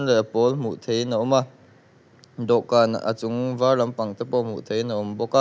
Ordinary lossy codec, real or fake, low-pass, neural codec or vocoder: none; real; none; none